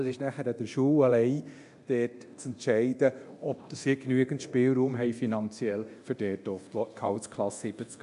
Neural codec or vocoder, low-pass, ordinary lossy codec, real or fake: codec, 24 kHz, 0.9 kbps, DualCodec; 10.8 kHz; MP3, 64 kbps; fake